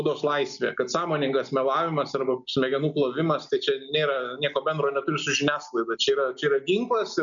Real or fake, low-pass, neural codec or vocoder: real; 7.2 kHz; none